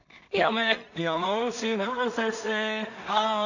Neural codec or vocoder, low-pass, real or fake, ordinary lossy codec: codec, 16 kHz in and 24 kHz out, 0.4 kbps, LongCat-Audio-Codec, two codebook decoder; 7.2 kHz; fake; none